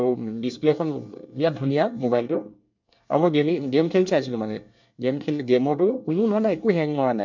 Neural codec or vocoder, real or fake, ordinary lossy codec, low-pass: codec, 24 kHz, 1 kbps, SNAC; fake; MP3, 64 kbps; 7.2 kHz